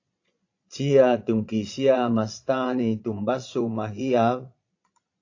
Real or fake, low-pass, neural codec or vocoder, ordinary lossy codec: fake; 7.2 kHz; vocoder, 22.05 kHz, 80 mel bands, Vocos; AAC, 48 kbps